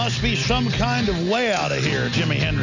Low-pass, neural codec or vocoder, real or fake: 7.2 kHz; none; real